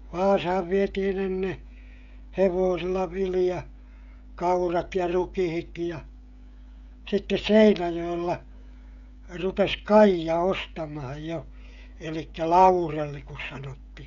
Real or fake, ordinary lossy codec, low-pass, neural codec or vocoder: fake; none; 7.2 kHz; codec, 16 kHz, 16 kbps, FreqCodec, smaller model